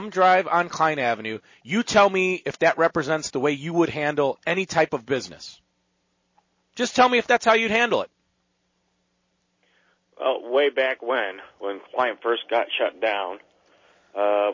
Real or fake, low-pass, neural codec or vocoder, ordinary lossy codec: real; 7.2 kHz; none; MP3, 32 kbps